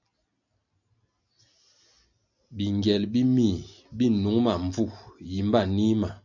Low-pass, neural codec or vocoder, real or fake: 7.2 kHz; none; real